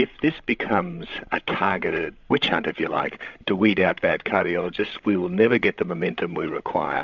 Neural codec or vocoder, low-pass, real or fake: codec, 16 kHz, 16 kbps, FreqCodec, larger model; 7.2 kHz; fake